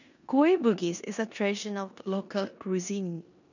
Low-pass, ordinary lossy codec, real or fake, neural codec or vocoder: 7.2 kHz; none; fake; codec, 16 kHz in and 24 kHz out, 0.9 kbps, LongCat-Audio-Codec, four codebook decoder